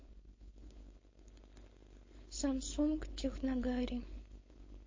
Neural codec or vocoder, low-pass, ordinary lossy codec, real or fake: codec, 16 kHz, 4.8 kbps, FACodec; 7.2 kHz; MP3, 32 kbps; fake